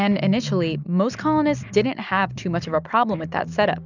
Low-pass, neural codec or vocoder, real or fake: 7.2 kHz; none; real